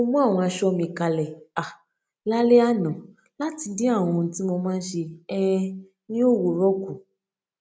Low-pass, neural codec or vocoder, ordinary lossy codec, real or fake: none; none; none; real